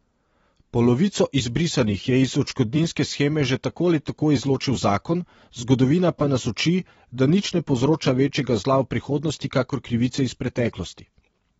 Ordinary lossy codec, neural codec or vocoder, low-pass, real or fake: AAC, 24 kbps; vocoder, 44.1 kHz, 128 mel bands every 256 samples, BigVGAN v2; 19.8 kHz; fake